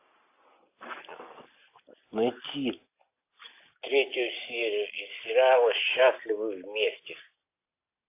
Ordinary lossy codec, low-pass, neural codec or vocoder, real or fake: AAC, 24 kbps; 3.6 kHz; none; real